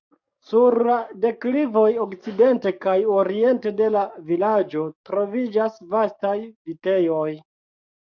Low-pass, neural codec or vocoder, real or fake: 7.2 kHz; codec, 44.1 kHz, 7.8 kbps, DAC; fake